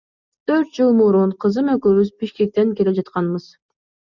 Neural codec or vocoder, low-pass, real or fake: none; 7.2 kHz; real